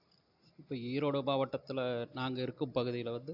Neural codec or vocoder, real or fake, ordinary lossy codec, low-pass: none; real; AAC, 48 kbps; 5.4 kHz